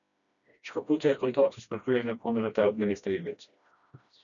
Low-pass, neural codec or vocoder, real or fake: 7.2 kHz; codec, 16 kHz, 1 kbps, FreqCodec, smaller model; fake